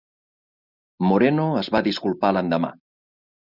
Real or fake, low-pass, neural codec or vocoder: real; 5.4 kHz; none